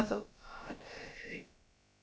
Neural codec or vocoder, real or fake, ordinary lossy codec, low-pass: codec, 16 kHz, about 1 kbps, DyCAST, with the encoder's durations; fake; none; none